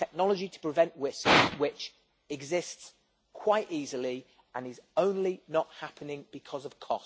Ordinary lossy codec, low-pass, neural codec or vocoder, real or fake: none; none; none; real